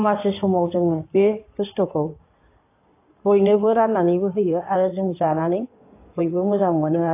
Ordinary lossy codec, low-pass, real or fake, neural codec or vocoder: AAC, 24 kbps; 3.6 kHz; fake; codec, 16 kHz in and 24 kHz out, 2.2 kbps, FireRedTTS-2 codec